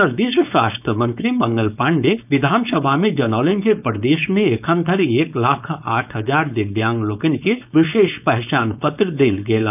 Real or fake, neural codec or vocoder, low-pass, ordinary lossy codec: fake; codec, 16 kHz, 4.8 kbps, FACodec; 3.6 kHz; none